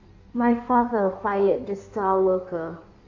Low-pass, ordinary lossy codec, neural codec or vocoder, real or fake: 7.2 kHz; AAC, 48 kbps; codec, 16 kHz in and 24 kHz out, 1.1 kbps, FireRedTTS-2 codec; fake